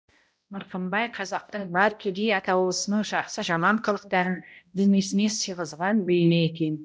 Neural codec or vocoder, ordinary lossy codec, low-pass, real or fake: codec, 16 kHz, 0.5 kbps, X-Codec, HuBERT features, trained on balanced general audio; none; none; fake